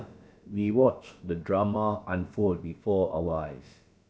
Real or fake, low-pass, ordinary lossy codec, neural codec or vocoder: fake; none; none; codec, 16 kHz, about 1 kbps, DyCAST, with the encoder's durations